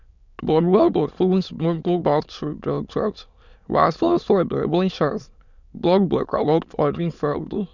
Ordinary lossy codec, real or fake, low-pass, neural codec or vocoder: none; fake; 7.2 kHz; autoencoder, 22.05 kHz, a latent of 192 numbers a frame, VITS, trained on many speakers